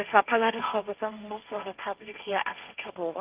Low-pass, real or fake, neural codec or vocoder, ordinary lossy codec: 3.6 kHz; fake; codec, 16 kHz, 1.1 kbps, Voila-Tokenizer; Opus, 32 kbps